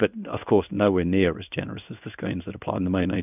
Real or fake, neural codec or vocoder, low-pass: fake; codec, 16 kHz in and 24 kHz out, 1 kbps, XY-Tokenizer; 3.6 kHz